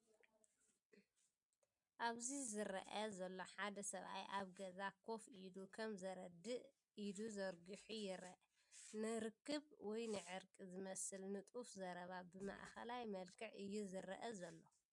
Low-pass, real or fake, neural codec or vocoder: 10.8 kHz; real; none